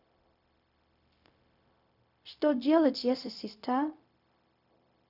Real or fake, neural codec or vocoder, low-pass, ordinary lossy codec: fake; codec, 16 kHz, 0.4 kbps, LongCat-Audio-Codec; 5.4 kHz; none